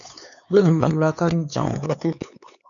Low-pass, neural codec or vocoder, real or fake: 7.2 kHz; codec, 16 kHz, 4 kbps, X-Codec, HuBERT features, trained on LibriSpeech; fake